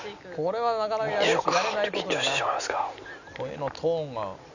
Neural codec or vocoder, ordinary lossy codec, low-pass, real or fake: none; none; 7.2 kHz; real